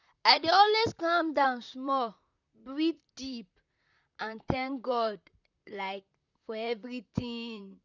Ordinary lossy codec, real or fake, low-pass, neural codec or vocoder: none; fake; 7.2 kHz; vocoder, 44.1 kHz, 128 mel bands, Pupu-Vocoder